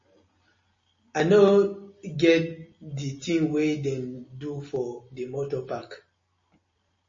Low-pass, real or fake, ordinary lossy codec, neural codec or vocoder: 7.2 kHz; real; MP3, 32 kbps; none